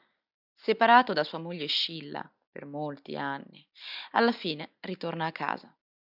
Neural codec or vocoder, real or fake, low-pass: none; real; 5.4 kHz